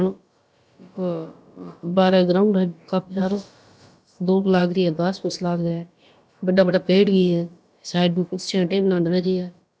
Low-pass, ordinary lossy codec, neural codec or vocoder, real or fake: none; none; codec, 16 kHz, about 1 kbps, DyCAST, with the encoder's durations; fake